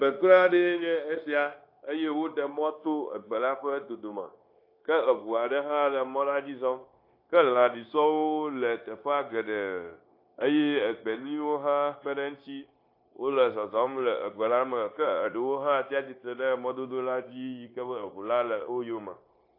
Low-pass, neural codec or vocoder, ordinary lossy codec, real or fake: 5.4 kHz; codec, 16 kHz, 0.9 kbps, LongCat-Audio-Codec; AAC, 32 kbps; fake